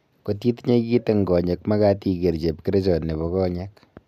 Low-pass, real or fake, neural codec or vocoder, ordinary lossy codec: 14.4 kHz; real; none; none